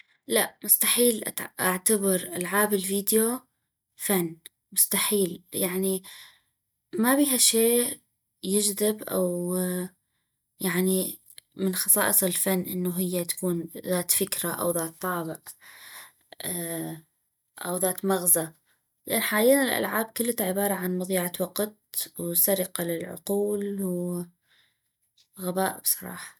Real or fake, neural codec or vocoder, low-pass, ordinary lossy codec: real; none; none; none